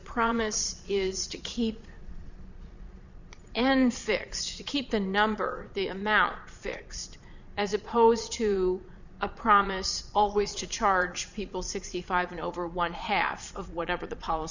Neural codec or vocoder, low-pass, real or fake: vocoder, 22.05 kHz, 80 mel bands, Vocos; 7.2 kHz; fake